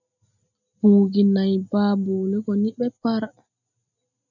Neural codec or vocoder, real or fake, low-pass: none; real; 7.2 kHz